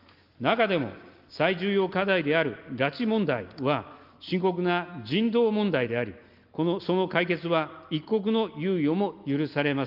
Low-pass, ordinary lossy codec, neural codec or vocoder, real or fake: 5.4 kHz; Opus, 32 kbps; none; real